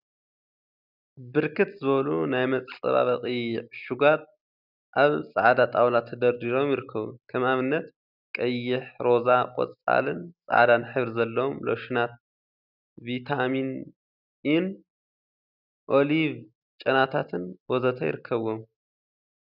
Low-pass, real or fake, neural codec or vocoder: 5.4 kHz; real; none